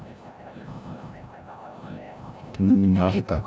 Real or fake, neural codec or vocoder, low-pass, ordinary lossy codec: fake; codec, 16 kHz, 0.5 kbps, FreqCodec, larger model; none; none